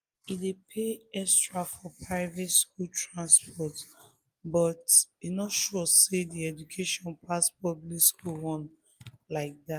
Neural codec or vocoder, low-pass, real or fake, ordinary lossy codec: none; 14.4 kHz; real; Opus, 32 kbps